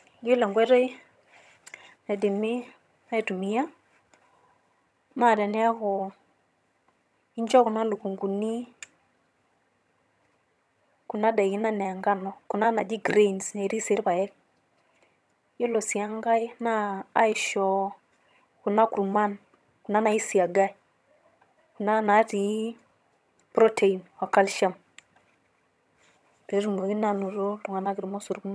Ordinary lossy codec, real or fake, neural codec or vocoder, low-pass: none; fake; vocoder, 22.05 kHz, 80 mel bands, HiFi-GAN; none